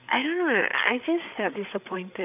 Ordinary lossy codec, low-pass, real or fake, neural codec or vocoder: none; 3.6 kHz; fake; codec, 16 kHz, 8 kbps, FreqCodec, larger model